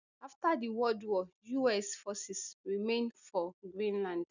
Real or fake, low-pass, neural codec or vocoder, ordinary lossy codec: real; 7.2 kHz; none; none